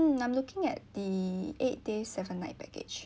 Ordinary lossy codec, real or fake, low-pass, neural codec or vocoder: none; real; none; none